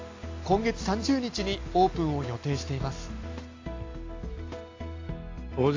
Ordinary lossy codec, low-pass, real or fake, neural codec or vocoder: AAC, 32 kbps; 7.2 kHz; real; none